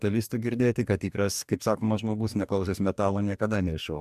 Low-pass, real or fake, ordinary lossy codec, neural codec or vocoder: 14.4 kHz; fake; MP3, 96 kbps; codec, 44.1 kHz, 2.6 kbps, DAC